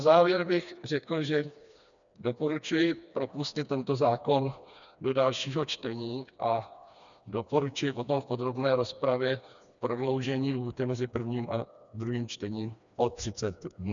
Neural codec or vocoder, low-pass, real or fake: codec, 16 kHz, 2 kbps, FreqCodec, smaller model; 7.2 kHz; fake